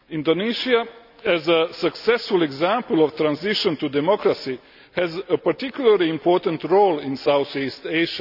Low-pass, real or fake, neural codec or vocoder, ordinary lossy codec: 5.4 kHz; real; none; none